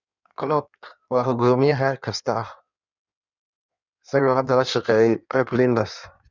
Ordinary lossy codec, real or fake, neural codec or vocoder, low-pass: none; fake; codec, 16 kHz in and 24 kHz out, 1.1 kbps, FireRedTTS-2 codec; 7.2 kHz